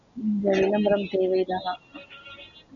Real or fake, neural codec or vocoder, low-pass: real; none; 7.2 kHz